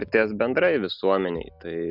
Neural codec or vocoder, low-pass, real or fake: none; 5.4 kHz; real